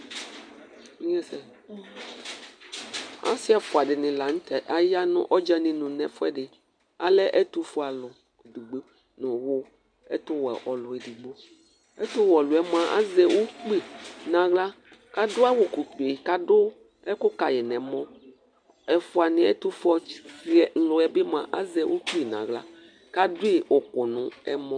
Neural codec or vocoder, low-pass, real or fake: none; 9.9 kHz; real